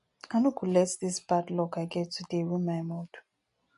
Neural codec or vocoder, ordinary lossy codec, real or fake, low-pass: none; MP3, 48 kbps; real; 10.8 kHz